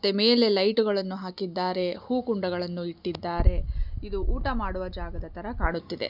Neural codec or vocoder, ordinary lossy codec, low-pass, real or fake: none; none; 5.4 kHz; real